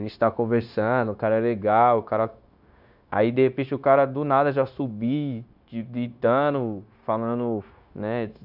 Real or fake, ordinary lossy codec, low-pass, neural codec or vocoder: fake; none; 5.4 kHz; codec, 16 kHz, 0.9 kbps, LongCat-Audio-Codec